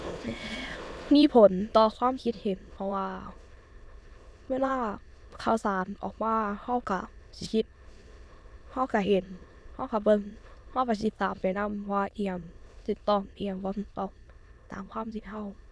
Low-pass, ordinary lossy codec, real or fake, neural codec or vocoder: none; none; fake; autoencoder, 22.05 kHz, a latent of 192 numbers a frame, VITS, trained on many speakers